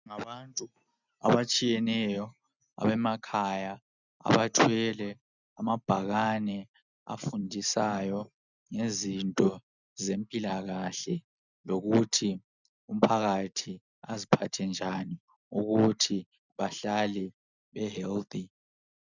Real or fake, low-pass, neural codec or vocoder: real; 7.2 kHz; none